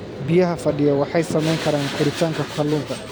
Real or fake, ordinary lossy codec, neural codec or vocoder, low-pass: fake; none; vocoder, 44.1 kHz, 128 mel bands every 256 samples, BigVGAN v2; none